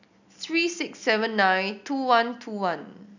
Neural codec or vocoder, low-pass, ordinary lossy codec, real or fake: none; 7.2 kHz; none; real